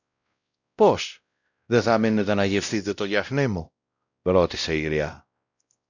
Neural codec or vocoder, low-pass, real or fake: codec, 16 kHz, 0.5 kbps, X-Codec, WavLM features, trained on Multilingual LibriSpeech; 7.2 kHz; fake